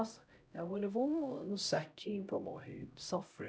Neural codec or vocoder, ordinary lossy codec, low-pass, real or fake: codec, 16 kHz, 0.5 kbps, X-Codec, HuBERT features, trained on LibriSpeech; none; none; fake